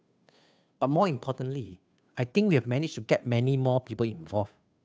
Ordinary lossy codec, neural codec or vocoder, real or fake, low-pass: none; codec, 16 kHz, 2 kbps, FunCodec, trained on Chinese and English, 25 frames a second; fake; none